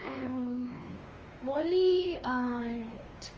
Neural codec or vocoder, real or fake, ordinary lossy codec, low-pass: codec, 16 kHz, 2 kbps, X-Codec, WavLM features, trained on Multilingual LibriSpeech; fake; Opus, 24 kbps; 7.2 kHz